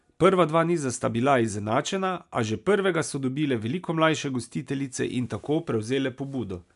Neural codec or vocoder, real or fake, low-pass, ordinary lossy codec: none; real; 10.8 kHz; MP3, 96 kbps